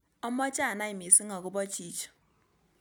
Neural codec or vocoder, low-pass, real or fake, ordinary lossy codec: none; none; real; none